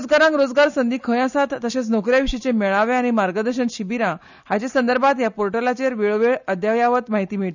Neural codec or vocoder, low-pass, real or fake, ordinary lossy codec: none; 7.2 kHz; real; none